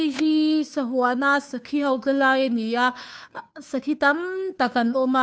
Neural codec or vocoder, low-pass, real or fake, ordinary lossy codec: codec, 16 kHz, 2 kbps, FunCodec, trained on Chinese and English, 25 frames a second; none; fake; none